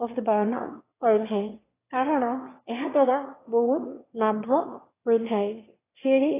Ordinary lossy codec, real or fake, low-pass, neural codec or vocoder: AAC, 16 kbps; fake; 3.6 kHz; autoencoder, 22.05 kHz, a latent of 192 numbers a frame, VITS, trained on one speaker